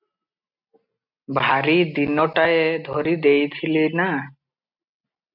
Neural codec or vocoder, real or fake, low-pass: none; real; 5.4 kHz